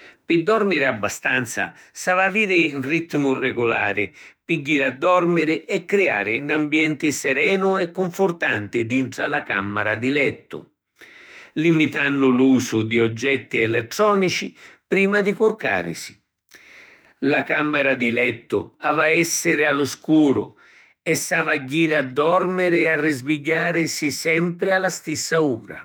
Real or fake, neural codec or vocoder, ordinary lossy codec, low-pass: fake; autoencoder, 48 kHz, 32 numbers a frame, DAC-VAE, trained on Japanese speech; none; none